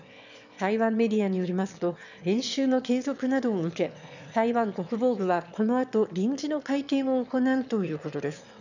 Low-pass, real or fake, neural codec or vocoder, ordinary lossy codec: 7.2 kHz; fake; autoencoder, 22.05 kHz, a latent of 192 numbers a frame, VITS, trained on one speaker; none